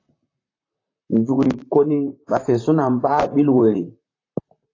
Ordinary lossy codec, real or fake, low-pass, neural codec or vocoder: AAC, 32 kbps; fake; 7.2 kHz; vocoder, 44.1 kHz, 128 mel bands, Pupu-Vocoder